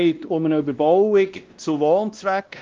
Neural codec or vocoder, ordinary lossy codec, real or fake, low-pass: codec, 16 kHz, 1 kbps, X-Codec, WavLM features, trained on Multilingual LibriSpeech; Opus, 32 kbps; fake; 7.2 kHz